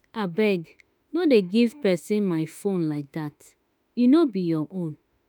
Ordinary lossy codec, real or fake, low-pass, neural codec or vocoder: none; fake; none; autoencoder, 48 kHz, 32 numbers a frame, DAC-VAE, trained on Japanese speech